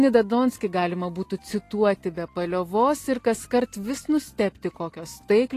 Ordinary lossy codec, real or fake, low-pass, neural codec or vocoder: AAC, 48 kbps; real; 14.4 kHz; none